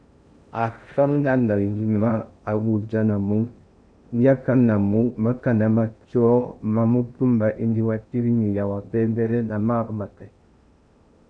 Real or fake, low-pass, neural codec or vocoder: fake; 9.9 kHz; codec, 16 kHz in and 24 kHz out, 0.6 kbps, FocalCodec, streaming, 2048 codes